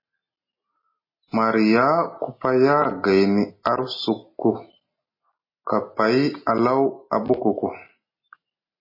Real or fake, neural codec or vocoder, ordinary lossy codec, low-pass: real; none; MP3, 24 kbps; 5.4 kHz